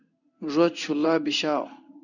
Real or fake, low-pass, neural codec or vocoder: fake; 7.2 kHz; codec, 16 kHz in and 24 kHz out, 1 kbps, XY-Tokenizer